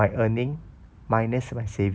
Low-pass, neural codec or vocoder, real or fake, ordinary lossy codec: none; none; real; none